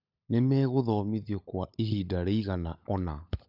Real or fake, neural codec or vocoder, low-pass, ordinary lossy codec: fake; codec, 16 kHz, 16 kbps, FunCodec, trained on LibriTTS, 50 frames a second; 5.4 kHz; none